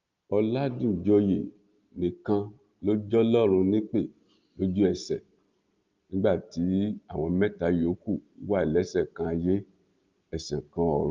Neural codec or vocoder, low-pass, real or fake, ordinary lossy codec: none; 7.2 kHz; real; Opus, 32 kbps